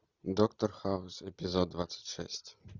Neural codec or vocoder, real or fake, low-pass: none; real; 7.2 kHz